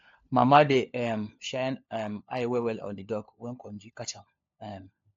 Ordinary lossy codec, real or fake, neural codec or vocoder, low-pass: AAC, 48 kbps; fake; codec, 16 kHz, 4 kbps, FunCodec, trained on LibriTTS, 50 frames a second; 7.2 kHz